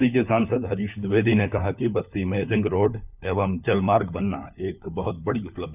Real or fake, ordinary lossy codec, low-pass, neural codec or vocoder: fake; none; 3.6 kHz; codec, 16 kHz, 4 kbps, FunCodec, trained on LibriTTS, 50 frames a second